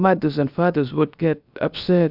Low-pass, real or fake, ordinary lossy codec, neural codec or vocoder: 5.4 kHz; fake; AAC, 48 kbps; codec, 16 kHz, about 1 kbps, DyCAST, with the encoder's durations